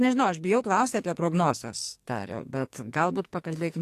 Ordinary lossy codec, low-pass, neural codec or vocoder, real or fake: AAC, 64 kbps; 14.4 kHz; codec, 44.1 kHz, 2.6 kbps, SNAC; fake